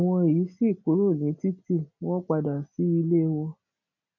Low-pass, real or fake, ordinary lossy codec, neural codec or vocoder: 7.2 kHz; real; none; none